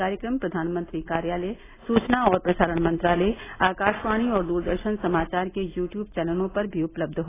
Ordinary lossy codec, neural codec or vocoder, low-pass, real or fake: AAC, 16 kbps; none; 3.6 kHz; real